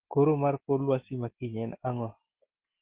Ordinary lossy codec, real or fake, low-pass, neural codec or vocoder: Opus, 16 kbps; real; 3.6 kHz; none